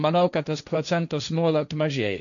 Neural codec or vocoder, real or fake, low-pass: codec, 16 kHz, 1.1 kbps, Voila-Tokenizer; fake; 7.2 kHz